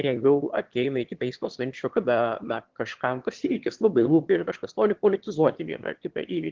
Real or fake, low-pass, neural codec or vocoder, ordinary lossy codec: fake; 7.2 kHz; autoencoder, 22.05 kHz, a latent of 192 numbers a frame, VITS, trained on one speaker; Opus, 16 kbps